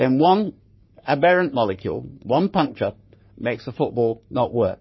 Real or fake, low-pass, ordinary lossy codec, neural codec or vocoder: fake; 7.2 kHz; MP3, 24 kbps; codec, 44.1 kHz, 3.4 kbps, Pupu-Codec